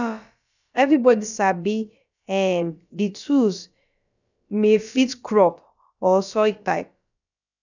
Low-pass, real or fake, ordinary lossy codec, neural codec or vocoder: 7.2 kHz; fake; none; codec, 16 kHz, about 1 kbps, DyCAST, with the encoder's durations